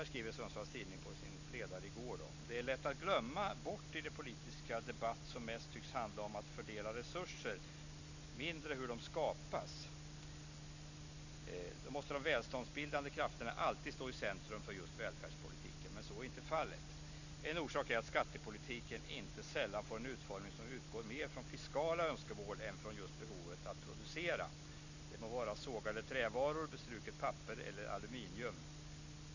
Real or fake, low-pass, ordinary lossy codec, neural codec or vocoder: real; 7.2 kHz; none; none